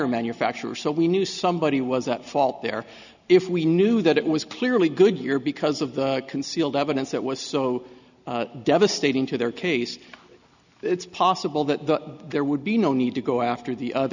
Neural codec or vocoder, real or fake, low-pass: none; real; 7.2 kHz